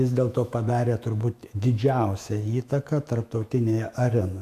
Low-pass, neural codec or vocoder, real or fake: 14.4 kHz; autoencoder, 48 kHz, 128 numbers a frame, DAC-VAE, trained on Japanese speech; fake